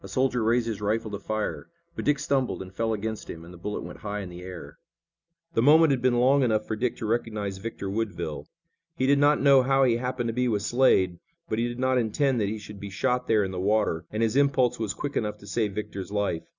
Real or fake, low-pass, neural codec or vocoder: real; 7.2 kHz; none